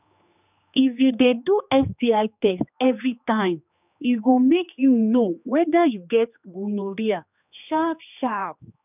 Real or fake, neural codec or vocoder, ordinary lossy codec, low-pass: fake; codec, 16 kHz, 2 kbps, X-Codec, HuBERT features, trained on general audio; none; 3.6 kHz